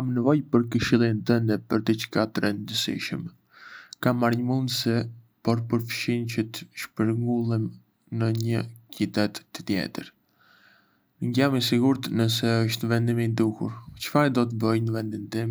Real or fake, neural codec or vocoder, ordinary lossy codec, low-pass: real; none; none; none